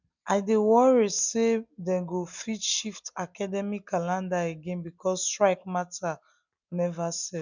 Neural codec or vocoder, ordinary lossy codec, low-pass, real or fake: none; none; 7.2 kHz; real